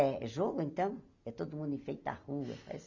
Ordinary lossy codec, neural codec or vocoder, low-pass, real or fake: none; none; 7.2 kHz; real